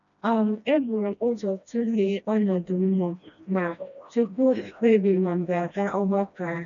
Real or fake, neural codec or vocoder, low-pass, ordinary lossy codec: fake; codec, 16 kHz, 1 kbps, FreqCodec, smaller model; 7.2 kHz; none